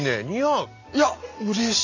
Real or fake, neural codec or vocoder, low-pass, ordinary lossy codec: real; none; 7.2 kHz; none